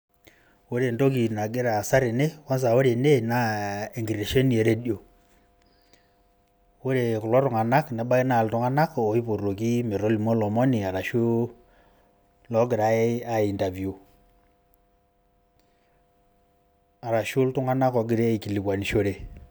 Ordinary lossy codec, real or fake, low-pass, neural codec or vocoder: none; real; none; none